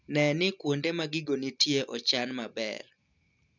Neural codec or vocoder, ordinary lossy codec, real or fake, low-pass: none; none; real; 7.2 kHz